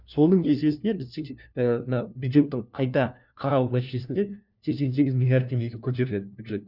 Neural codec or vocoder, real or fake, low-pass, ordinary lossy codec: codec, 16 kHz, 1 kbps, FunCodec, trained on LibriTTS, 50 frames a second; fake; 5.4 kHz; none